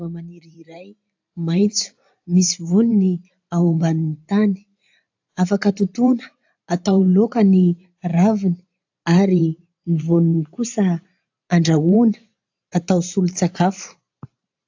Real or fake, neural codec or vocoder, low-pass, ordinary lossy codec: fake; vocoder, 44.1 kHz, 128 mel bands every 512 samples, BigVGAN v2; 7.2 kHz; AAC, 48 kbps